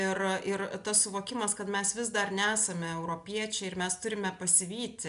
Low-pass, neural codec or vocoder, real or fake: 10.8 kHz; none; real